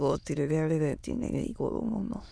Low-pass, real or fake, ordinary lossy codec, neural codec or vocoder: none; fake; none; autoencoder, 22.05 kHz, a latent of 192 numbers a frame, VITS, trained on many speakers